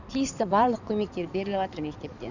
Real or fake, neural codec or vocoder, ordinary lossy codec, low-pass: fake; codec, 16 kHz in and 24 kHz out, 2.2 kbps, FireRedTTS-2 codec; none; 7.2 kHz